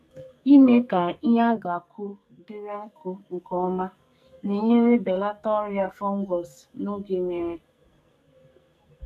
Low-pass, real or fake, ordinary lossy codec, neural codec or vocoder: 14.4 kHz; fake; none; codec, 44.1 kHz, 2.6 kbps, SNAC